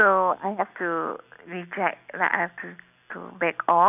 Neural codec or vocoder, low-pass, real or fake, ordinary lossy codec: none; 3.6 kHz; real; none